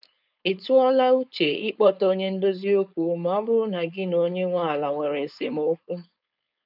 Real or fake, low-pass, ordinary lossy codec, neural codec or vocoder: fake; 5.4 kHz; none; codec, 16 kHz, 4.8 kbps, FACodec